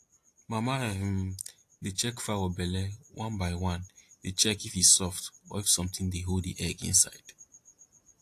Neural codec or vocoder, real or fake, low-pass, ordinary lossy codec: none; real; 14.4 kHz; AAC, 64 kbps